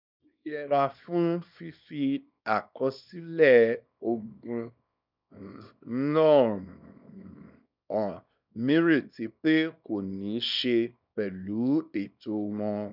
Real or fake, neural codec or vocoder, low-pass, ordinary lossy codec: fake; codec, 24 kHz, 0.9 kbps, WavTokenizer, small release; 5.4 kHz; none